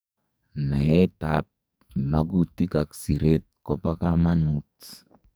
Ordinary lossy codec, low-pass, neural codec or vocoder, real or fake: none; none; codec, 44.1 kHz, 2.6 kbps, SNAC; fake